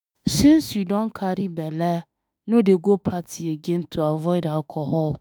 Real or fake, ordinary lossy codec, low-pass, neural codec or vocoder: fake; none; none; autoencoder, 48 kHz, 32 numbers a frame, DAC-VAE, trained on Japanese speech